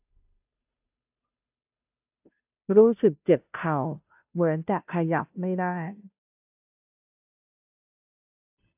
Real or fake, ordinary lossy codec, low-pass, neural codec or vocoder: fake; AAC, 32 kbps; 3.6 kHz; codec, 16 kHz, 0.5 kbps, FunCodec, trained on Chinese and English, 25 frames a second